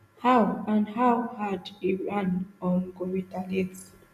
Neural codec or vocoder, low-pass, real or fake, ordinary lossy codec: none; 14.4 kHz; real; none